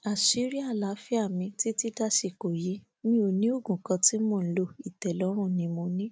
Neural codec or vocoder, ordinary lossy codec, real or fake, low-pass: none; none; real; none